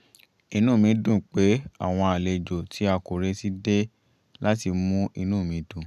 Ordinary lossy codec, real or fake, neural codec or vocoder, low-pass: none; real; none; 14.4 kHz